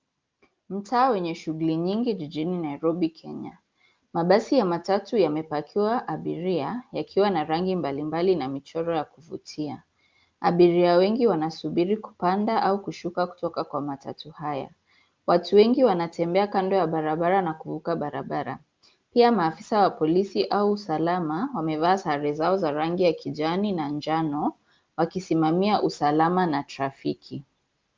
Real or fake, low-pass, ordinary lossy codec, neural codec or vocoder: real; 7.2 kHz; Opus, 24 kbps; none